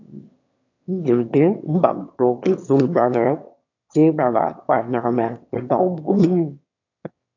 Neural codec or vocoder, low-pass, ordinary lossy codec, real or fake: autoencoder, 22.05 kHz, a latent of 192 numbers a frame, VITS, trained on one speaker; 7.2 kHz; AAC, 48 kbps; fake